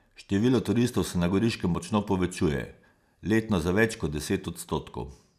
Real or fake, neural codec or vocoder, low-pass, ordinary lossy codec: real; none; 14.4 kHz; none